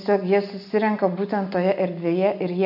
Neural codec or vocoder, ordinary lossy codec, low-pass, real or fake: none; AAC, 48 kbps; 5.4 kHz; real